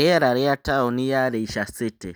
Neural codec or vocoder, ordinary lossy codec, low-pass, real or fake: none; none; none; real